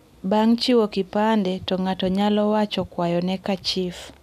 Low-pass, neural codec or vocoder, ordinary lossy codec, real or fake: 14.4 kHz; none; none; real